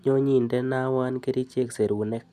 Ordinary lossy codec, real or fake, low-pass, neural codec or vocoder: none; real; 14.4 kHz; none